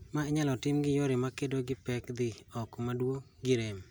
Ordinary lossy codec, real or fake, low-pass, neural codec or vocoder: none; real; none; none